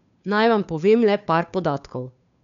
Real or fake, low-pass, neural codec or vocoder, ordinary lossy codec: fake; 7.2 kHz; codec, 16 kHz, 2 kbps, FunCodec, trained on Chinese and English, 25 frames a second; none